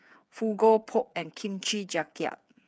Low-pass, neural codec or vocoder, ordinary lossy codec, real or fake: none; codec, 16 kHz, 8 kbps, FreqCodec, smaller model; none; fake